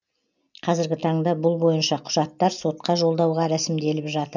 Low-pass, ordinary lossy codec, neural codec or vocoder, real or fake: 7.2 kHz; none; none; real